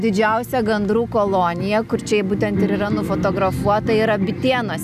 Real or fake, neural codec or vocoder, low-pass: real; none; 14.4 kHz